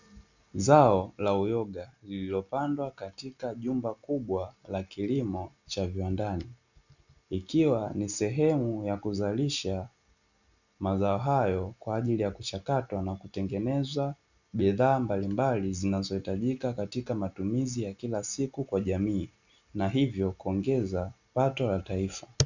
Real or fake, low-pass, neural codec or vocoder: real; 7.2 kHz; none